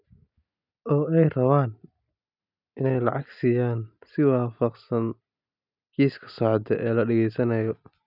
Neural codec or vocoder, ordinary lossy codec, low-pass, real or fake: none; none; 5.4 kHz; real